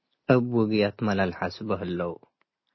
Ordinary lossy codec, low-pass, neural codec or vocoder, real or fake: MP3, 24 kbps; 7.2 kHz; none; real